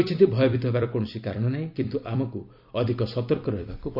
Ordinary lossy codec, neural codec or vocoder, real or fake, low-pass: none; vocoder, 44.1 kHz, 128 mel bands every 256 samples, BigVGAN v2; fake; 5.4 kHz